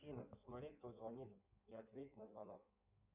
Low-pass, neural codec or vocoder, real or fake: 3.6 kHz; codec, 16 kHz in and 24 kHz out, 1.1 kbps, FireRedTTS-2 codec; fake